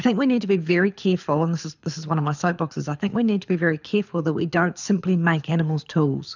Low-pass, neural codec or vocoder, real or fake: 7.2 kHz; codec, 24 kHz, 6 kbps, HILCodec; fake